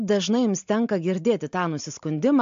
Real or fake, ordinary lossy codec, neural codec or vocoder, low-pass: real; MP3, 48 kbps; none; 7.2 kHz